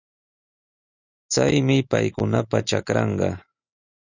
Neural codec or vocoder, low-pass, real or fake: none; 7.2 kHz; real